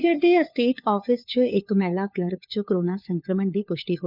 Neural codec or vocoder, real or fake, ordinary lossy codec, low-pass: codec, 16 kHz, 4 kbps, FunCodec, trained on LibriTTS, 50 frames a second; fake; none; 5.4 kHz